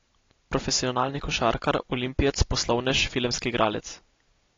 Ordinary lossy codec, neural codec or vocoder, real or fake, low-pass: AAC, 32 kbps; none; real; 7.2 kHz